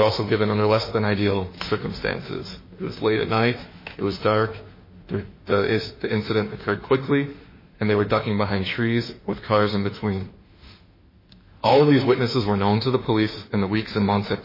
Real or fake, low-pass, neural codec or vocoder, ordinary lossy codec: fake; 5.4 kHz; autoencoder, 48 kHz, 32 numbers a frame, DAC-VAE, trained on Japanese speech; MP3, 24 kbps